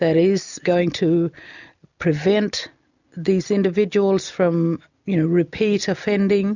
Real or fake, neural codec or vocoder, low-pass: real; none; 7.2 kHz